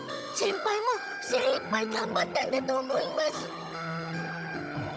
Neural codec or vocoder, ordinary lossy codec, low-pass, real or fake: codec, 16 kHz, 16 kbps, FunCodec, trained on Chinese and English, 50 frames a second; none; none; fake